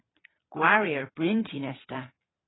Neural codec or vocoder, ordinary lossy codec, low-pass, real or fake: vocoder, 22.05 kHz, 80 mel bands, Vocos; AAC, 16 kbps; 7.2 kHz; fake